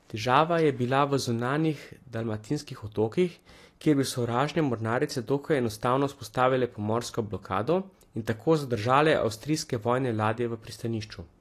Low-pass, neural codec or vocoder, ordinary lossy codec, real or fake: 14.4 kHz; none; AAC, 48 kbps; real